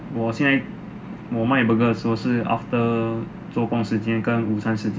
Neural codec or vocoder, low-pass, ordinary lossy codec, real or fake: none; none; none; real